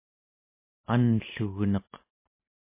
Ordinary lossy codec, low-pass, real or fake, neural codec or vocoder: MP3, 24 kbps; 3.6 kHz; real; none